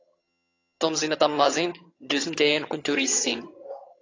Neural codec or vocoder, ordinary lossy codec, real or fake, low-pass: vocoder, 22.05 kHz, 80 mel bands, HiFi-GAN; AAC, 32 kbps; fake; 7.2 kHz